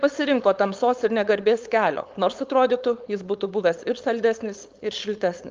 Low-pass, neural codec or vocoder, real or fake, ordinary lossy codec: 7.2 kHz; codec, 16 kHz, 4.8 kbps, FACodec; fake; Opus, 24 kbps